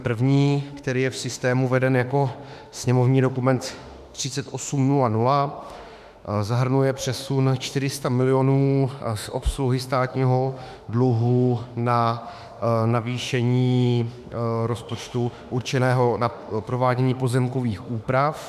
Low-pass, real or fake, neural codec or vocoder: 14.4 kHz; fake; autoencoder, 48 kHz, 32 numbers a frame, DAC-VAE, trained on Japanese speech